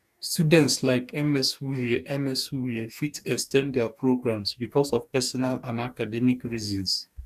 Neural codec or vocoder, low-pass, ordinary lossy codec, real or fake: codec, 44.1 kHz, 2.6 kbps, DAC; 14.4 kHz; none; fake